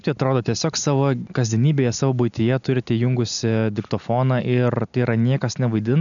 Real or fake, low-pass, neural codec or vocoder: real; 7.2 kHz; none